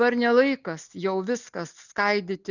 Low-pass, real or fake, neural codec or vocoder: 7.2 kHz; real; none